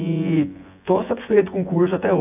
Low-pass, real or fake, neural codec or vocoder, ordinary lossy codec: 3.6 kHz; fake; vocoder, 24 kHz, 100 mel bands, Vocos; AAC, 32 kbps